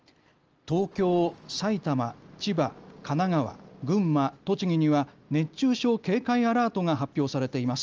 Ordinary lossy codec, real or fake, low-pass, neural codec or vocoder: Opus, 24 kbps; real; 7.2 kHz; none